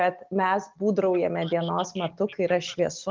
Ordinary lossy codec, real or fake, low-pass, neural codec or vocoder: Opus, 32 kbps; real; 7.2 kHz; none